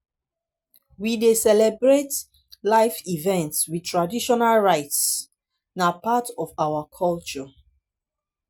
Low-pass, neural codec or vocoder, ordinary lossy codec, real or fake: none; none; none; real